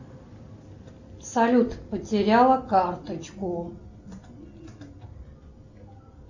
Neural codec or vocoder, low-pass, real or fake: none; 7.2 kHz; real